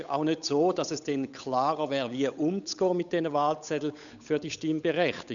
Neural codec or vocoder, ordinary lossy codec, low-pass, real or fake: codec, 16 kHz, 8 kbps, FunCodec, trained on Chinese and English, 25 frames a second; none; 7.2 kHz; fake